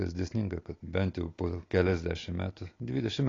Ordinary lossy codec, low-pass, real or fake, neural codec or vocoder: AAC, 32 kbps; 7.2 kHz; real; none